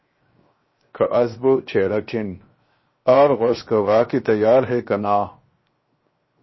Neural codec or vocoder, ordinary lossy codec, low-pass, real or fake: codec, 16 kHz, 0.7 kbps, FocalCodec; MP3, 24 kbps; 7.2 kHz; fake